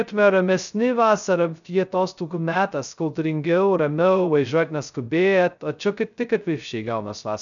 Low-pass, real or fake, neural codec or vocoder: 7.2 kHz; fake; codec, 16 kHz, 0.2 kbps, FocalCodec